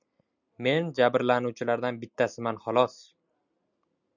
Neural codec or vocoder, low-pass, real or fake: none; 7.2 kHz; real